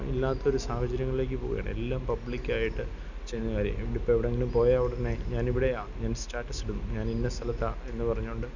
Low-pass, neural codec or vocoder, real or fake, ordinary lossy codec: 7.2 kHz; none; real; none